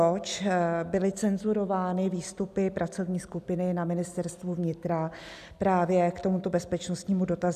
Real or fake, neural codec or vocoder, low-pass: real; none; 14.4 kHz